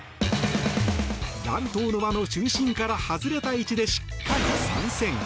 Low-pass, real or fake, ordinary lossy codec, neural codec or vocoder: none; real; none; none